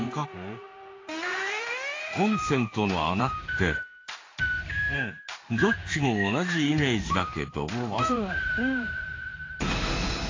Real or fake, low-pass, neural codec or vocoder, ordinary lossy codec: fake; 7.2 kHz; codec, 16 kHz in and 24 kHz out, 1 kbps, XY-Tokenizer; AAC, 32 kbps